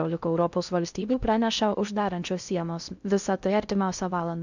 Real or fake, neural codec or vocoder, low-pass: fake; codec, 16 kHz in and 24 kHz out, 0.6 kbps, FocalCodec, streaming, 2048 codes; 7.2 kHz